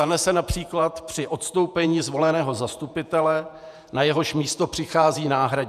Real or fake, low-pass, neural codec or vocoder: fake; 14.4 kHz; vocoder, 48 kHz, 128 mel bands, Vocos